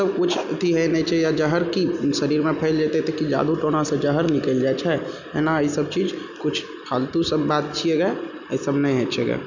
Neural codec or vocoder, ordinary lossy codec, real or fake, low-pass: none; none; real; 7.2 kHz